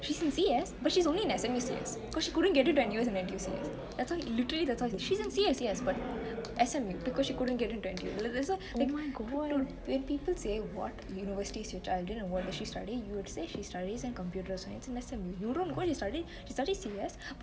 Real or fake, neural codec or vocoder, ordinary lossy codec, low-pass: real; none; none; none